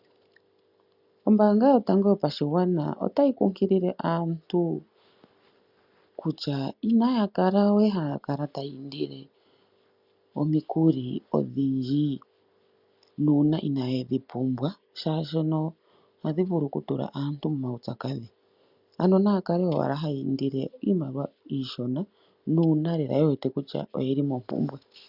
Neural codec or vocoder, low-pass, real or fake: none; 5.4 kHz; real